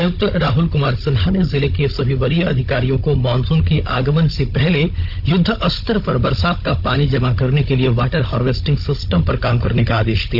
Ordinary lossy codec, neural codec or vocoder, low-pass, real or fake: none; codec, 16 kHz, 16 kbps, FunCodec, trained on Chinese and English, 50 frames a second; 5.4 kHz; fake